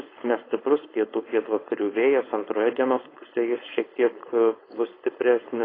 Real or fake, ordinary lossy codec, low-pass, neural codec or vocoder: fake; AAC, 24 kbps; 5.4 kHz; codec, 16 kHz, 4.8 kbps, FACodec